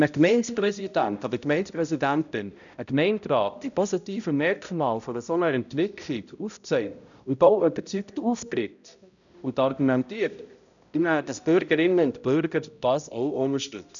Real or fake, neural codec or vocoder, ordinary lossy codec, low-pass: fake; codec, 16 kHz, 0.5 kbps, X-Codec, HuBERT features, trained on balanced general audio; none; 7.2 kHz